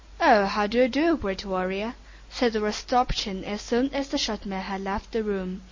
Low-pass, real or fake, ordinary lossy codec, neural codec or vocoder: 7.2 kHz; real; MP3, 32 kbps; none